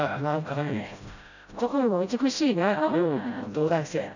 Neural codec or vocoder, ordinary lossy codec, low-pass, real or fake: codec, 16 kHz, 0.5 kbps, FreqCodec, smaller model; none; 7.2 kHz; fake